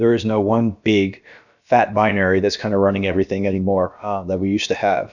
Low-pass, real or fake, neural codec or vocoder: 7.2 kHz; fake; codec, 16 kHz, about 1 kbps, DyCAST, with the encoder's durations